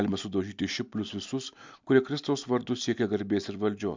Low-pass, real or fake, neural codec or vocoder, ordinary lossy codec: 7.2 kHz; real; none; MP3, 64 kbps